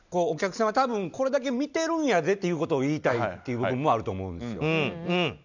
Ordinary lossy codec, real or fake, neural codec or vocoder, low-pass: none; real; none; 7.2 kHz